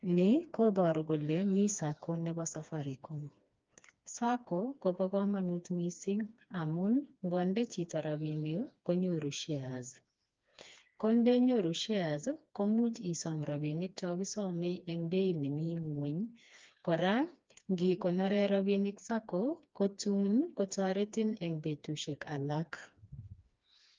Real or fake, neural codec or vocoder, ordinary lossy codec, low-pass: fake; codec, 16 kHz, 2 kbps, FreqCodec, smaller model; Opus, 24 kbps; 7.2 kHz